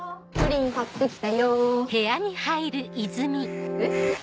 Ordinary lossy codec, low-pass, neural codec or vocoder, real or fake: none; none; none; real